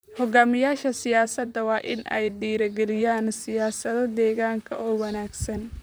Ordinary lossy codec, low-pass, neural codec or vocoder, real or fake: none; none; vocoder, 44.1 kHz, 128 mel bands, Pupu-Vocoder; fake